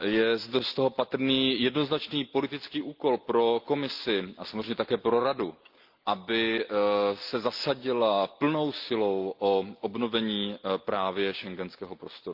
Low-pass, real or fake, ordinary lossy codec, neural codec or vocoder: 5.4 kHz; real; Opus, 32 kbps; none